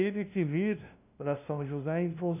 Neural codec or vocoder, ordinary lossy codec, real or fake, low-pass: codec, 16 kHz, 0.5 kbps, FunCodec, trained on LibriTTS, 25 frames a second; none; fake; 3.6 kHz